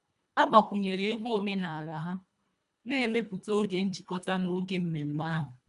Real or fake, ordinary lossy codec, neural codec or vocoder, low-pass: fake; none; codec, 24 kHz, 1.5 kbps, HILCodec; 10.8 kHz